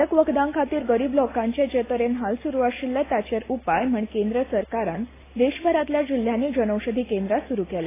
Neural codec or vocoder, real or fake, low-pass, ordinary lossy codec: none; real; 3.6 kHz; AAC, 16 kbps